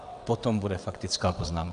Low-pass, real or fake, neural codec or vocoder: 9.9 kHz; fake; vocoder, 22.05 kHz, 80 mel bands, WaveNeXt